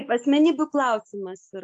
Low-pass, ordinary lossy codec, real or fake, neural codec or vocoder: 9.9 kHz; AAC, 64 kbps; real; none